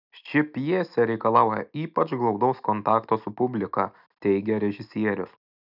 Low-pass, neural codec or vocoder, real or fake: 5.4 kHz; none; real